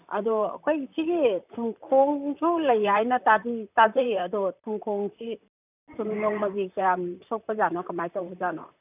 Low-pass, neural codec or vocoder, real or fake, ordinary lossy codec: 3.6 kHz; vocoder, 44.1 kHz, 128 mel bands, Pupu-Vocoder; fake; none